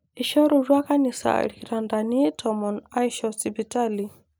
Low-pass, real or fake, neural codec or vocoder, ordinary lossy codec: none; real; none; none